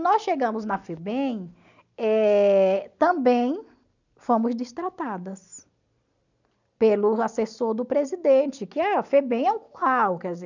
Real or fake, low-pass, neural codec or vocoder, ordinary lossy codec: real; 7.2 kHz; none; none